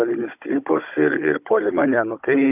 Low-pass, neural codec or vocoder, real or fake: 3.6 kHz; codec, 16 kHz, 16 kbps, FunCodec, trained on Chinese and English, 50 frames a second; fake